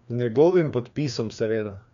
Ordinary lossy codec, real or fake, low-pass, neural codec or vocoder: none; fake; 7.2 kHz; codec, 16 kHz, 2 kbps, FreqCodec, larger model